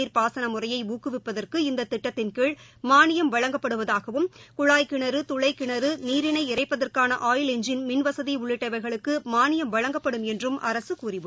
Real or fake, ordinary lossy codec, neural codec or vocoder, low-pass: real; none; none; none